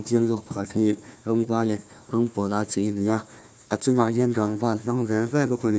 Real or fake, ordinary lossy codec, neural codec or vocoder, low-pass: fake; none; codec, 16 kHz, 1 kbps, FunCodec, trained on Chinese and English, 50 frames a second; none